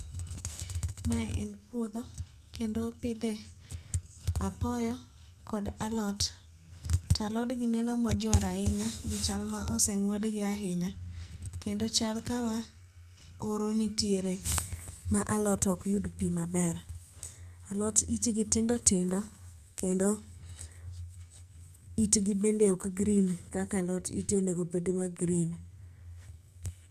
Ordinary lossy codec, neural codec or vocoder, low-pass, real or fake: none; codec, 44.1 kHz, 2.6 kbps, SNAC; 14.4 kHz; fake